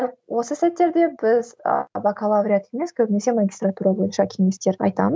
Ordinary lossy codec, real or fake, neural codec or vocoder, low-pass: none; real; none; none